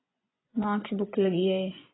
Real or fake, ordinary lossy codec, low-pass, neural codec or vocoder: fake; AAC, 16 kbps; 7.2 kHz; vocoder, 24 kHz, 100 mel bands, Vocos